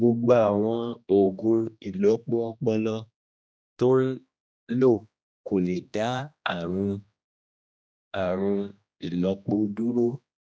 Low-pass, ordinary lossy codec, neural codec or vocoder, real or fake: none; none; codec, 16 kHz, 1 kbps, X-Codec, HuBERT features, trained on general audio; fake